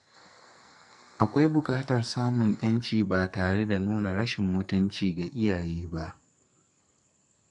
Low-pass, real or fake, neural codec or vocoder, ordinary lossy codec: 10.8 kHz; fake; codec, 44.1 kHz, 2.6 kbps, SNAC; none